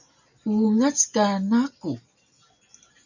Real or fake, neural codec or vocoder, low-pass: real; none; 7.2 kHz